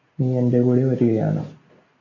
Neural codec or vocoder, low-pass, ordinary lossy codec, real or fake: none; 7.2 kHz; AAC, 32 kbps; real